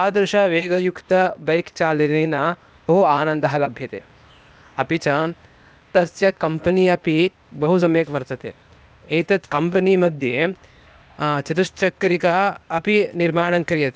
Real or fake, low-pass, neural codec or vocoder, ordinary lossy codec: fake; none; codec, 16 kHz, 0.8 kbps, ZipCodec; none